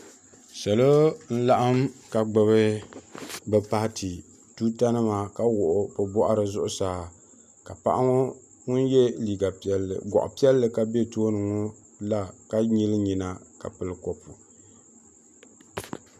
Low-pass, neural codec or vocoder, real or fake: 14.4 kHz; none; real